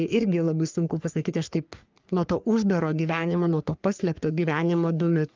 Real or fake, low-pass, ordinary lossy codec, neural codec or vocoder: fake; 7.2 kHz; Opus, 24 kbps; codec, 44.1 kHz, 3.4 kbps, Pupu-Codec